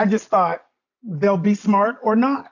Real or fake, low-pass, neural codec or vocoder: real; 7.2 kHz; none